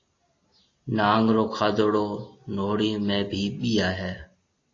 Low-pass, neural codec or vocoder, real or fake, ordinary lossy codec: 7.2 kHz; none; real; AAC, 32 kbps